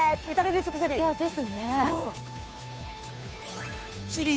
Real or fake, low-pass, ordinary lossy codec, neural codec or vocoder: fake; none; none; codec, 16 kHz, 2 kbps, FunCodec, trained on Chinese and English, 25 frames a second